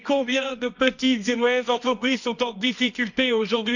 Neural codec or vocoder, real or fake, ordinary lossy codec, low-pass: codec, 24 kHz, 0.9 kbps, WavTokenizer, medium music audio release; fake; none; 7.2 kHz